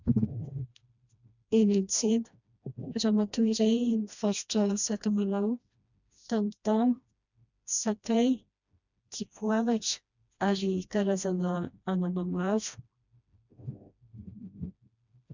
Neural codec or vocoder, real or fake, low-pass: codec, 16 kHz, 1 kbps, FreqCodec, smaller model; fake; 7.2 kHz